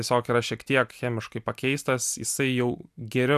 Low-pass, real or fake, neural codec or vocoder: 14.4 kHz; real; none